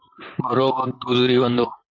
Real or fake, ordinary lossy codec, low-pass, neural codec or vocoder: fake; AAC, 48 kbps; 7.2 kHz; vocoder, 44.1 kHz, 128 mel bands, Pupu-Vocoder